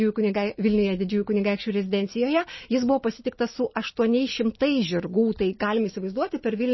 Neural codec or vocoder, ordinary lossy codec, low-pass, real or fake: none; MP3, 24 kbps; 7.2 kHz; real